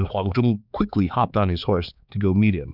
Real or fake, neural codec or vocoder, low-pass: fake; codec, 16 kHz, 4 kbps, X-Codec, HuBERT features, trained on balanced general audio; 5.4 kHz